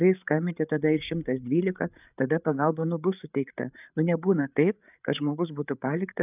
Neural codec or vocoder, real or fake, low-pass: codec, 16 kHz, 8 kbps, FreqCodec, larger model; fake; 3.6 kHz